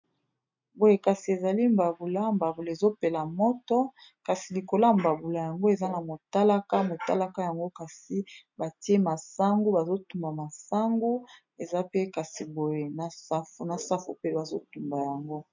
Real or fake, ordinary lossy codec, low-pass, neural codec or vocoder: real; MP3, 64 kbps; 7.2 kHz; none